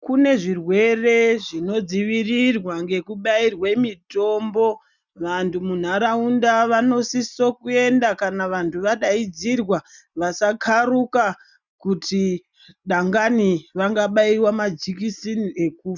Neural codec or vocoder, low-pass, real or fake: none; 7.2 kHz; real